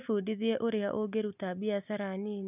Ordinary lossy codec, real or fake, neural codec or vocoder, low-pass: none; real; none; 3.6 kHz